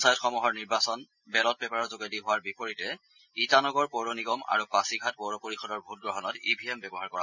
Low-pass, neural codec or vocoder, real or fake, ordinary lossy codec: 7.2 kHz; none; real; none